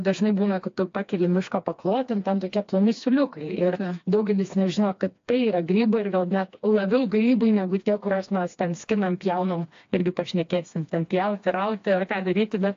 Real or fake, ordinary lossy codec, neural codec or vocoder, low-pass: fake; AAC, 48 kbps; codec, 16 kHz, 2 kbps, FreqCodec, smaller model; 7.2 kHz